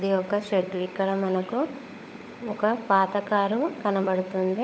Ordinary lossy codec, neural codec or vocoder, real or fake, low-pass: none; codec, 16 kHz, 16 kbps, FunCodec, trained on LibriTTS, 50 frames a second; fake; none